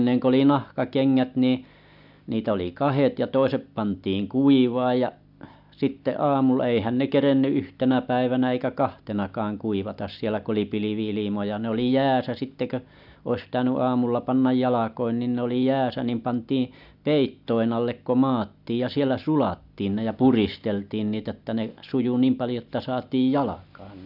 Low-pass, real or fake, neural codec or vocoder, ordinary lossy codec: 5.4 kHz; real; none; none